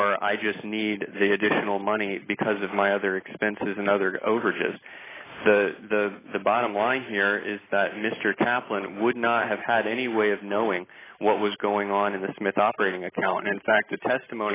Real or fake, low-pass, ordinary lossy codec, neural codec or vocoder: real; 3.6 kHz; AAC, 16 kbps; none